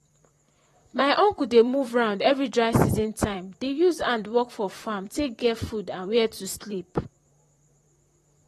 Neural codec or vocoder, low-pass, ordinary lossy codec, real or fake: vocoder, 44.1 kHz, 128 mel bands, Pupu-Vocoder; 19.8 kHz; AAC, 32 kbps; fake